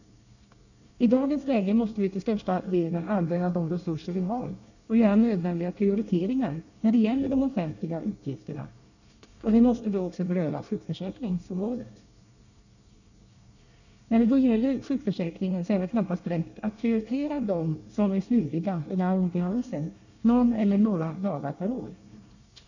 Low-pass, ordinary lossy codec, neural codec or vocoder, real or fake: 7.2 kHz; none; codec, 24 kHz, 1 kbps, SNAC; fake